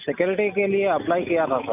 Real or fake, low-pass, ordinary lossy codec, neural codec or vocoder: real; 3.6 kHz; none; none